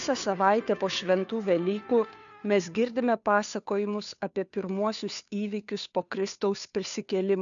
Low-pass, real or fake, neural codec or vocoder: 7.2 kHz; fake; codec, 16 kHz, 2 kbps, FunCodec, trained on Chinese and English, 25 frames a second